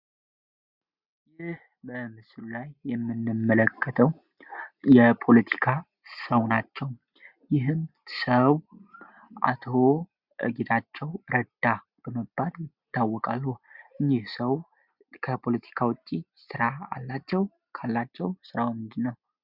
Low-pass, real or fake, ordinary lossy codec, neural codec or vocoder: 5.4 kHz; real; Opus, 64 kbps; none